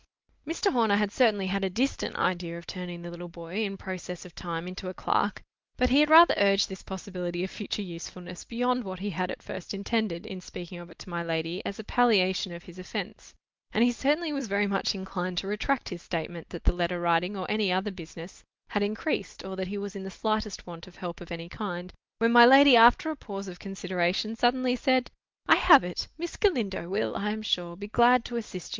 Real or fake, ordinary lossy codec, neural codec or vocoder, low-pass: real; Opus, 24 kbps; none; 7.2 kHz